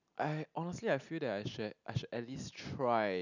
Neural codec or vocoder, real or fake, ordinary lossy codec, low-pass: none; real; none; 7.2 kHz